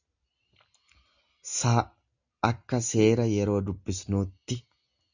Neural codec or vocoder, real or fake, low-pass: none; real; 7.2 kHz